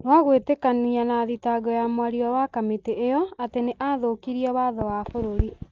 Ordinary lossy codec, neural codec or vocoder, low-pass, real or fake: Opus, 32 kbps; none; 7.2 kHz; real